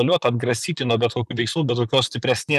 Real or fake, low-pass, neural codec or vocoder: fake; 14.4 kHz; vocoder, 44.1 kHz, 128 mel bands, Pupu-Vocoder